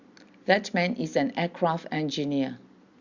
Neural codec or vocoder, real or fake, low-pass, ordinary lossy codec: none; real; 7.2 kHz; Opus, 64 kbps